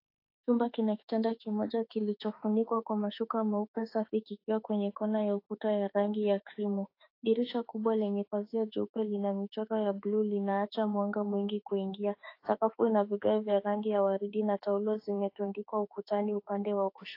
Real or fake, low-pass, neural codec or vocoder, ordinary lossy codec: fake; 5.4 kHz; autoencoder, 48 kHz, 32 numbers a frame, DAC-VAE, trained on Japanese speech; AAC, 32 kbps